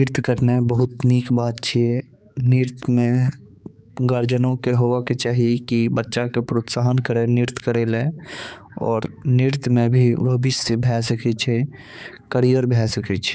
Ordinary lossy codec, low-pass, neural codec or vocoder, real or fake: none; none; codec, 16 kHz, 4 kbps, X-Codec, HuBERT features, trained on balanced general audio; fake